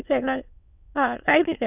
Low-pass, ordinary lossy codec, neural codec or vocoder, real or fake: 3.6 kHz; none; autoencoder, 22.05 kHz, a latent of 192 numbers a frame, VITS, trained on many speakers; fake